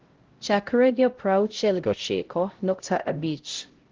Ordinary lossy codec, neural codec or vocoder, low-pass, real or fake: Opus, 16 kbps; codec, 16 kHz, 0.5 kbps, X-Codec, HuBERT features, trained on LibriSpeech; 7.2 kHz; fake